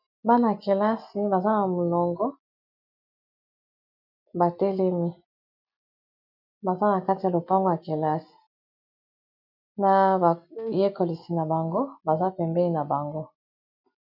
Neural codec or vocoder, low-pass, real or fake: none; 5.4 kHz; real